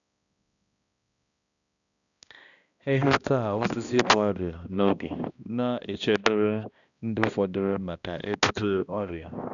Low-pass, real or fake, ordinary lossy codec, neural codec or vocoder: 7.2 kHz; fake; none; codec, 16 kHz, 1 kbps, X-Codec, HuBERT features, trained on balanced general audio